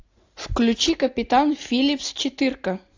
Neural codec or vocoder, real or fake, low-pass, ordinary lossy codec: none; real; 7.2 kHz; AAC, 48 kbps